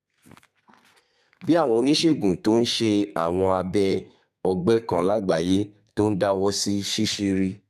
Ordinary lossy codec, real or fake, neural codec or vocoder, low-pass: none; fake; codec, 32 kHz, 1.9 kbps, SNAC; 14.4 kHz